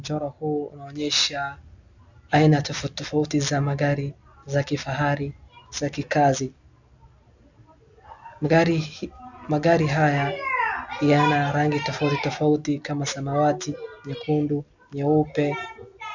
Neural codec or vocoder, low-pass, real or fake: none; 7.2 kHz; real